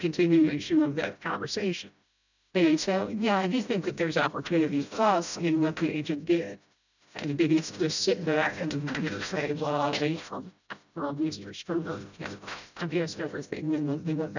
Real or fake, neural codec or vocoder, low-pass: fake; codec, 16 kHz, 0.5 kbps, FreqCodec, smaller model; 7.2 kHz